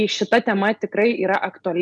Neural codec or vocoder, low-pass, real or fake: none; 10.8 kHz; real